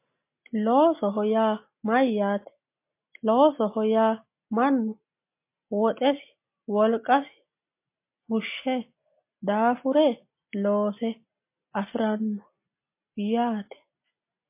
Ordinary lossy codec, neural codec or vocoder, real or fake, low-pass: MP3, 24 kbps; none; real; 3.6 kHz